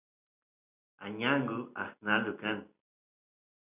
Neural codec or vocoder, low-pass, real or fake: none; 3.6 kHz; real